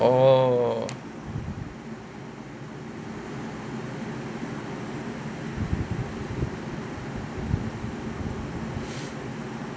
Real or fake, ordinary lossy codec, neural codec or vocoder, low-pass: real; none; none; none